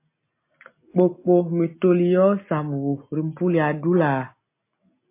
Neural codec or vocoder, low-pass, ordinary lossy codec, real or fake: none; 3.6 kHz; MP3, 24 kbps; real